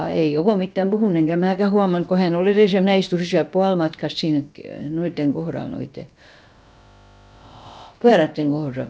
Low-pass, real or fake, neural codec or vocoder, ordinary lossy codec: none; fake; codec, 16 kHz, about 1 kbps, DyCAST, with the encoder's durations; none